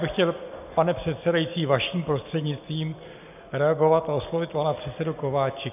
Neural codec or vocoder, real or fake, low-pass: none; real; 3.6 kHz